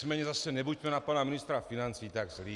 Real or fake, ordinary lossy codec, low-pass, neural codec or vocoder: real; Opus, 64 kbps; 10.8 kHz; none